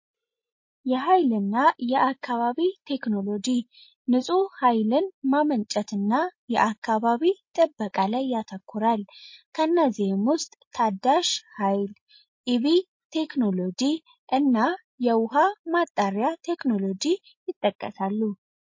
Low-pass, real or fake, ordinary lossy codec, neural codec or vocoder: 7.2 kHz; real; MP3, 32 kbps; none